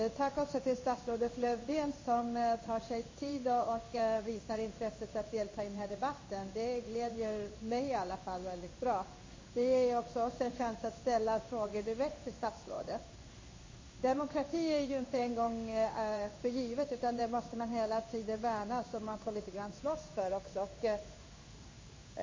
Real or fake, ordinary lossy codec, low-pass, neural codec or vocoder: fake; MP3, 32 kbps; 7.2 kHz; codec, 16 kHz in and 24 kHz out, 1 kbps, XY-Tokenizer